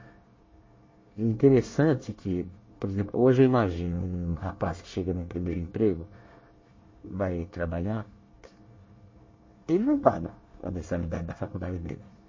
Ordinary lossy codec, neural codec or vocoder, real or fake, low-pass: MP3, 32 kbps; codec, 24 kHz, 1 kbps, SNAC; fake; 7.2 kHz